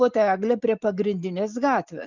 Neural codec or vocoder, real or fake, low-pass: none; real; 7.2 kHz